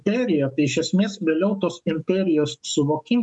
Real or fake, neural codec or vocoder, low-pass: fake; codec, 44.1 kHz, 7.8 kbps, DAC; 10.8 kHz